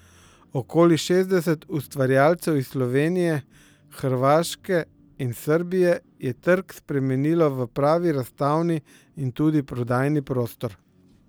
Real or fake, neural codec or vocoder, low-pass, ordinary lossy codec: real; none; none; none